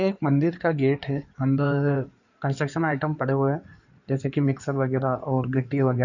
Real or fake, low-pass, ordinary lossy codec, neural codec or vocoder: fake; 7.2 kHz; none; codec, 16 kHz in and 24 kHz out, 2.2 kbps, FireRedTTS-2 codec